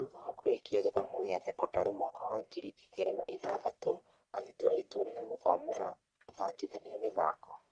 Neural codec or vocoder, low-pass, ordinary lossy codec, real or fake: codec, 44.1 kHz, 1.7 kbps, Pupu-Codec; 9.9 kHz; Opus, 64 kbps; fake